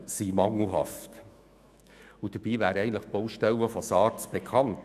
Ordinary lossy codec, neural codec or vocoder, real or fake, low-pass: none; autoencoder, 48 kHz, 128 numbers a frame, DAC-VAE, trained on Japanese speech; fake; 14.4 kHz